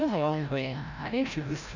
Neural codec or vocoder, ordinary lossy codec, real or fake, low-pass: codec, 16 kHz, 0.5 kbps, FreqCodec, larger model; none; fake; 7.2 kHz